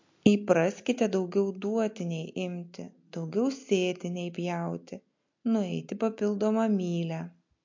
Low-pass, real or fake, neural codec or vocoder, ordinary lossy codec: 7.2 kHz; real; none; MP3, 48 kbps